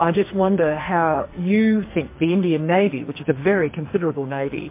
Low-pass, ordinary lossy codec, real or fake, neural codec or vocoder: 3.6 kHz; MP3, 24 kbps; fake; codec, 44.1 kHz, 2.6 kbps, SNAC